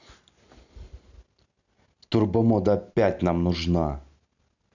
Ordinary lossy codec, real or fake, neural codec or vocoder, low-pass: none; real; none; 7.2 kHz